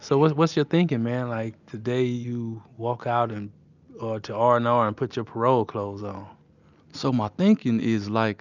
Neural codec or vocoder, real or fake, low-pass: none; real; 7.2 kHz